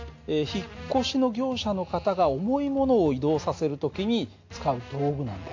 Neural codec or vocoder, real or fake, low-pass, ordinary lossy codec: none; real; 7.2 kHz; AAC, 48 kbps